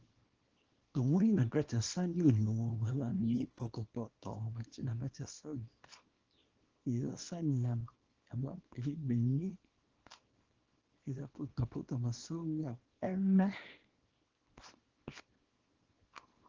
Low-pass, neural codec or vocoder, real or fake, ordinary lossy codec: 7.2 kHz; codec, 24 kHz, 0.9 kbps, WavTokenizer, small release; fake; Opus, 16 kbps